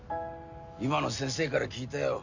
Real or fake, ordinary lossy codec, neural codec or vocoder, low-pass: real; Opus, 64 kbps; none; 7.2 kHz